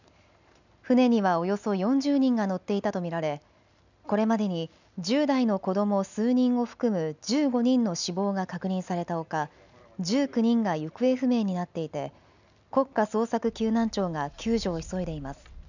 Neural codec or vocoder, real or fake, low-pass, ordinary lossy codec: none; real; 7.2 kHz; none